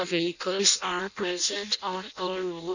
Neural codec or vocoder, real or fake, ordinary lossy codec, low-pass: codec, 16 kHz in and 24 kHz out, 0.6 kbps, FireRedTTS-2 codec; fake; none; 7.2 kHz